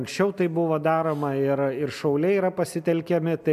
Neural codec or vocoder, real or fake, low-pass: none; real; 14.4 kHz